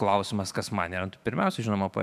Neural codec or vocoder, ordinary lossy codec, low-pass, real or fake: autoencoder, 48 kHz, 128 numbers a frame, DAC-VAE, trained on Japanese speech; MP3, 96 kbps; 14.4 kHz; fake